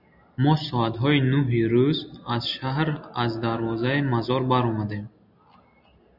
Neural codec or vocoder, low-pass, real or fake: none; 5.4 kHz; real